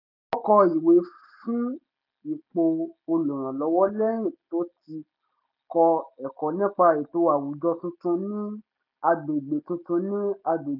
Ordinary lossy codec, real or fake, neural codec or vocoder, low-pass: none; real; none; 5.4 kHz